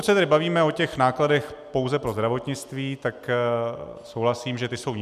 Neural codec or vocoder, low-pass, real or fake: none; 14.4 kHz; real